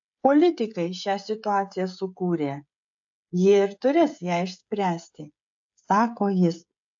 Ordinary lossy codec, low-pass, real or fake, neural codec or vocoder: AAC, 64 kbps; 7.2 kHz; fake; codec, 16 kHz, 16 kbps, FreqCodec, smaller model